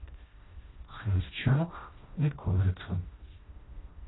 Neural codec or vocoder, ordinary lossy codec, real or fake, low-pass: codec, 16 kHz, 1 kbps, FreqCodec, smaller model; AAC, 16 kbps; fake; 7.2 kHz